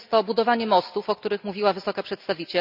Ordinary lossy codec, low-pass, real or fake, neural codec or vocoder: none; 5.4 kHz; real; none